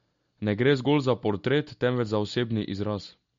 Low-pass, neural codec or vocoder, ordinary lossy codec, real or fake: 7.2 kHz; none; MP3, 48 kbps; real